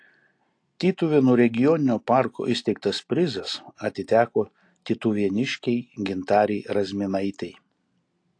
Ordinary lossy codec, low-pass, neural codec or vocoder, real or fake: AAC, 48 kbps; 9.9 kHz; none; real